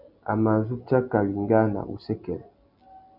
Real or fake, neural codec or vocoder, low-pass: real; none; 5.4 kHz